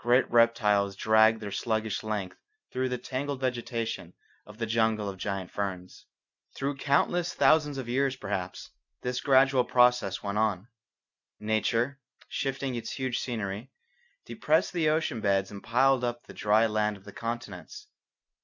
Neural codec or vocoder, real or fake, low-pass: none; real; 7.2 kHz